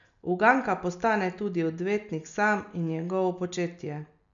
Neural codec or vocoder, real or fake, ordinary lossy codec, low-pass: none; real; none; 7.2 kHz